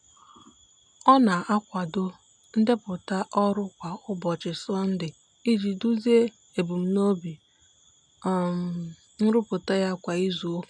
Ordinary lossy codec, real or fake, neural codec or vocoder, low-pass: none; real; none; 9.9 kHz